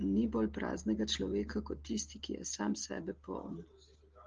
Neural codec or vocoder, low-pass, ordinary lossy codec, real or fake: none; 7.2 kHz; Opus, 16 kbps; real